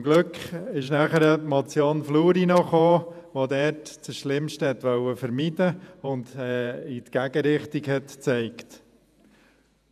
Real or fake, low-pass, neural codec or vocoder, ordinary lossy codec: fake; 14.4 kHz; vocoder, 48 kHz, 128 mel bands, Vocos; none